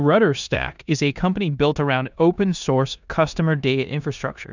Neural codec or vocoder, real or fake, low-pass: codec, 16 kHz in and 24 kHz out, 0.9 kbps, LongCat-Audio-Codec, four codebook decoder; fake; 7.2 kHz